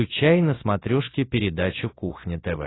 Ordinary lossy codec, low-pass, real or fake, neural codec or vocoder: AAC, 16 kbps; 7.2 kHz; real; none